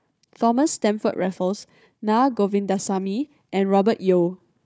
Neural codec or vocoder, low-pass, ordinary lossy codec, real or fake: codec, 16 kHz, 4 kbps, FunCodec, trained on Chinese and English, 50 frames a second; none; none; fake